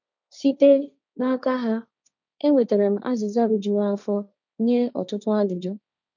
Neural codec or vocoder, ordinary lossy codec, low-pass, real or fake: codec, 16 kHz, 1.1 kbps, Voila-Tokenizer; none; 7.2 kHz; fake